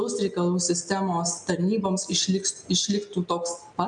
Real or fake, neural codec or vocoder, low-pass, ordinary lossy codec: real; none; 9.9 kHz; MP3, 96 kbps